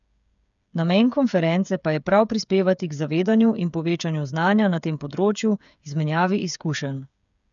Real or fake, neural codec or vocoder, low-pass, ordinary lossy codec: fake; codec, 16 kHz, 8 kbps, FreqCodec, smaller model; 7.2 kHz; none